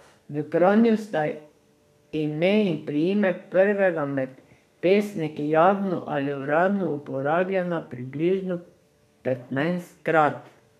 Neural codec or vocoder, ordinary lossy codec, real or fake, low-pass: codec, 32 kHz, 1.9 kbps, SNAC; none; fake; 14.4 kHz